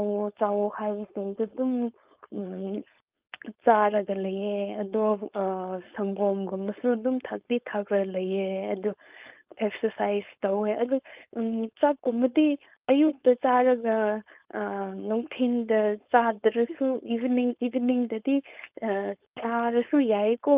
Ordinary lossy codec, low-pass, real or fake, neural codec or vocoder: Opus, 24 kbps; 3.6 kHz; fake; codec, 16 kHz, 4.8 kbps, FACodec